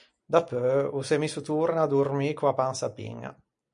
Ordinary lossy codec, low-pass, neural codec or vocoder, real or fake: MP3, 96 kbps; 9.9 kHz; none; real